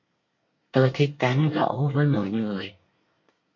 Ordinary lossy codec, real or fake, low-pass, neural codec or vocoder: MP3, 48 kbps; fake; 7.2 kHz; codec, 24 kHz, 1 kbps, SNAC